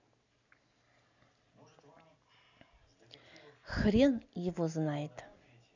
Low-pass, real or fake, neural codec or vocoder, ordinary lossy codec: 7.2 kHz; real; none; none